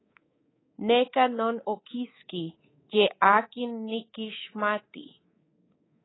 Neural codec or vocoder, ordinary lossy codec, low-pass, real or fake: codec, 24 kHz, 3.1 kbps, DualCodec; AAC, 16 kbps; 7.2 kHz; fake